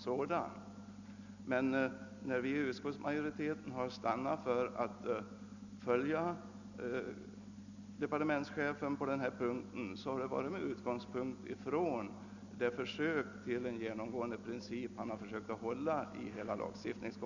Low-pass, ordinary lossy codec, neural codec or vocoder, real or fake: 7.2 kHz; none; none; real